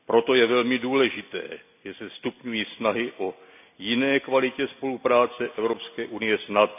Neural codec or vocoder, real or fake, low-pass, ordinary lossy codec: none; real; 3.6 kHz; none